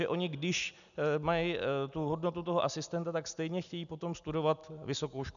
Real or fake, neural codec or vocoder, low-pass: real; none; 7.2 kHz